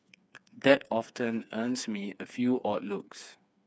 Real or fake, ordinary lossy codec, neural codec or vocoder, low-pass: fake; none; codec, 16 kHz, 4 kbps, FreqCodec, smaller model; none